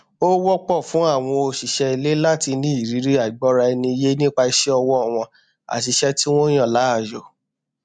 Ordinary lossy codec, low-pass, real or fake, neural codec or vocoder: MP3, 64 kbps; 9.9 kHz; real; none